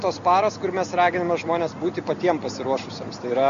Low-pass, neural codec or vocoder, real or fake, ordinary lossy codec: 7.2 kHz; none; real; Opus, 64 kbps